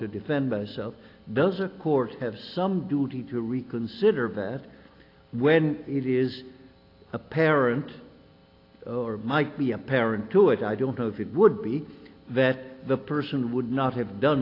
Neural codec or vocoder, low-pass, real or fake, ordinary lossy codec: none; 5.4 kHz; real; AAC, 32 kbps